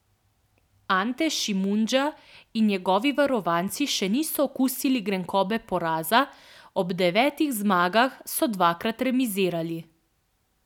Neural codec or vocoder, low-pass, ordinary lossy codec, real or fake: none; 19.8 kHz; none; real